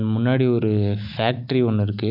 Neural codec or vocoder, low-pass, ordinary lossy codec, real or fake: none; 5.4 kHz; AAC, 48 kbps; real